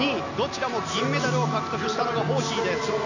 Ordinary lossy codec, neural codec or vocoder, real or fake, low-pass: none; none; real; 7.2 kHz